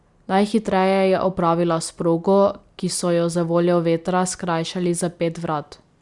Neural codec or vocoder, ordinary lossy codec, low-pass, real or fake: none; Opus, 64 kbps; 10.8 kHz; real